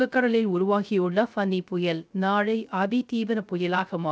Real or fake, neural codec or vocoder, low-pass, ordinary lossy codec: fake; codec, 16 kHz, 0.3 kbps, FocalCodec; none; none